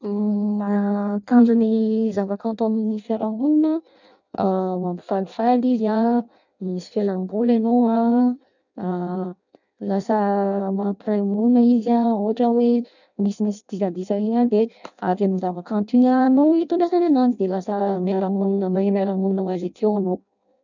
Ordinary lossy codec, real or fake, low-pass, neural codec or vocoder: none; fake; 7.2 kHz; codec, 16 kHz in and 24 kHz out, 0.6 kbps, FireRedTTS-2 codec